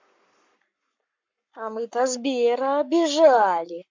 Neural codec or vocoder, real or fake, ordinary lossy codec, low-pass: codec, 44.1 kHz, 7.8 kbps, Pupu-Codec; fake; MP3, 64 kbps; 7.2 kHz